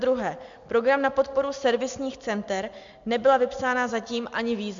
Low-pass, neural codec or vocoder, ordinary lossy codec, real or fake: 7.2 kHz; none; MP3, 64 kbps; real